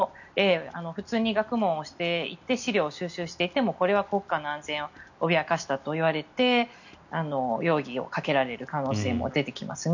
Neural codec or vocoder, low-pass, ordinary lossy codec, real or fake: none; 7.2 kHz; none; real